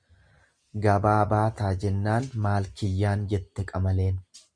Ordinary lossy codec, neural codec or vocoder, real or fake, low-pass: Opus, 64 kbps; none; real; 9.9 kHz